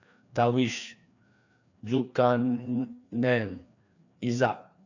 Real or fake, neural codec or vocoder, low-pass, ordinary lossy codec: fake; codec, 16 kHz, 2 kbps, FreqCodec, larger model; 7.2 kHz; none